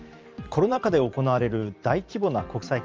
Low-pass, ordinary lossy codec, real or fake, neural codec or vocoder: 7.2 kHz; Opus, 24 kbps; fake; vocoder, 44.1 kHz, 128 mel bands every 512 samples, BigVGAN v2